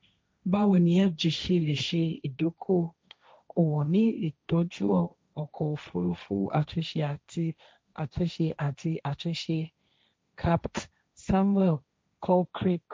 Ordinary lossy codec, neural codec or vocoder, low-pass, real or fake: none; codec, 16 kHz, 1.1 kbps, Voila-Tokenizer; none; fake